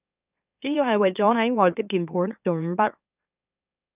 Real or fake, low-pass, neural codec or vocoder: fake; 3.6 kHz; autoencoder, 44.1 kHz, a latent of 192 numbers a frame, MeloTTS